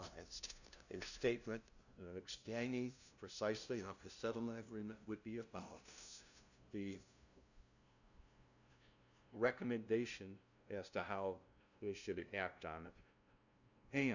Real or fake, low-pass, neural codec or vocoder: fake; 7.2 kHz; codec, 16 kHz, 0.5 kbps, FunCodec, trained on LibriTTS, 25 frames a second